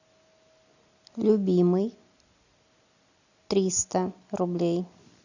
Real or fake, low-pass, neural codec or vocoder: real; 7.2 kHz; none